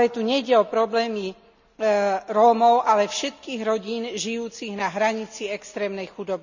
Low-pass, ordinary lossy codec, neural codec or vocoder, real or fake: 7.2 kHz; none; none; real